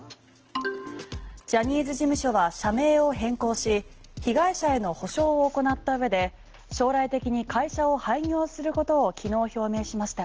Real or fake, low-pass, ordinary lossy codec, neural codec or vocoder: real; 7.2 kHz; Opus, 16 kbps; none